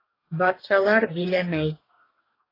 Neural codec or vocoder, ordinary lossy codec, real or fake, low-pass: codec, 44.1 kHz, 2.6 kbps, DAC; AAC, 24 kbps; fake; 5.4 kHz